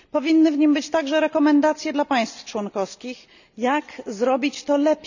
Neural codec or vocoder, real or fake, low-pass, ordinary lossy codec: none; real; 7.2 kHz; none